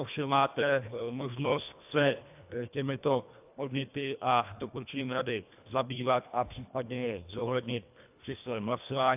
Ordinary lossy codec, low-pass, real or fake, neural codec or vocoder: AAC, 32 kbps; 3.6 kHz; fake; codec, 24 kHz, 1.5 kbps, HILCodec